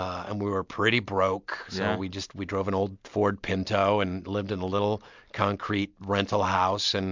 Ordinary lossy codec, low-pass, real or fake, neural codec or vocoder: MP3, 64 kbps; 7.2 kHz; real; none